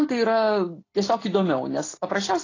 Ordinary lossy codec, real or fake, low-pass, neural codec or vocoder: AAC, 32 kbps; real; 7.2 kHz; none